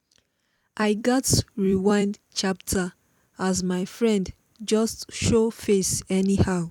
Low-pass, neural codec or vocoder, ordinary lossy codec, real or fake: 19.8 kHz; vocoder, 44.1 kHz, 128 mel bands every 256 samples, BigVGAN v2; none; fake